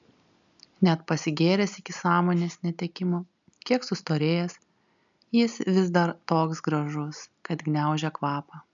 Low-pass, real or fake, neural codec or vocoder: 7.2 kHz; real; none